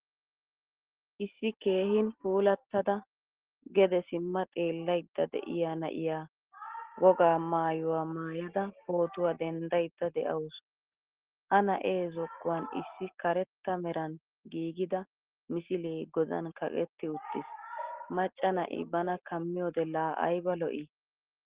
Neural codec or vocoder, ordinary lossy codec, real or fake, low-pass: none; Opus, 16 kbps; real; 3.6 kHz